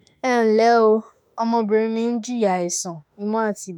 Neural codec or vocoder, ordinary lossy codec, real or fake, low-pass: autoencoder, 48 kHz, 32 numbers a frame, DAC-VAE, trained on Japanese speech; none; fake; 19.8 kHz